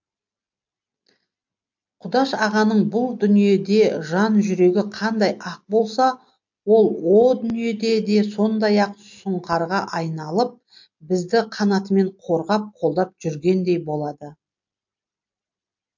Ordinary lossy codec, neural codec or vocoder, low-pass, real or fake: MP3, 48 kbps; none; 7.2 kHz; real